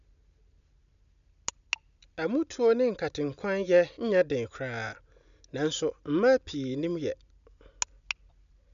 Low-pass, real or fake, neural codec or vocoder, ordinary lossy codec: 7.2 kHz; real; none; none